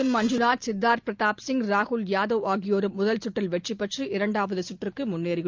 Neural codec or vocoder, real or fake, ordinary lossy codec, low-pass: none; real; Opus, 24 kbps; 7.2 kHz